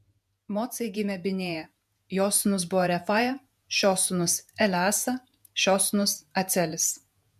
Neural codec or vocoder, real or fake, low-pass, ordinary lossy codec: vocoder, 48 kHz, 128 mel bands, Vocos; fake; 14.4 kHz; MP3, 96 kbps